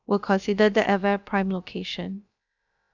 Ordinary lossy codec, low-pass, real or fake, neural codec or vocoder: none; 7.2 kHz; fake; codec, 16 kHz, about 1 kbps, DyCAST, with the encoder's durations